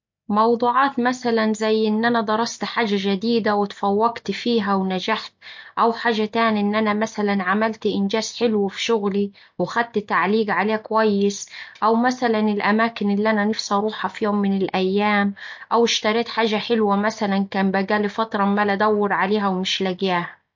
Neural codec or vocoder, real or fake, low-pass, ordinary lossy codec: none; real; 7.2 kHz; MP3, 48 kbps